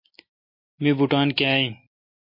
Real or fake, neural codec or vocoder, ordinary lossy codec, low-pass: real; none; MP3, 32 kbps; 5.4 kHz